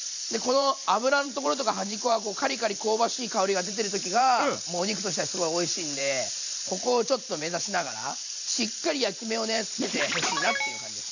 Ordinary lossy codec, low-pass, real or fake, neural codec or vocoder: none; 7.2 kHz; fake; vocoder, 44.1 kHz, 128 mel bands every 512 samples, BigVGAN v2